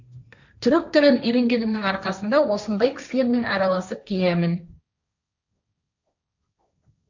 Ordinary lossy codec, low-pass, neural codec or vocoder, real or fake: none; 7.2 kHz; codec, 16 kHz, 1.1 kbps, Voila-Tokenizer; fake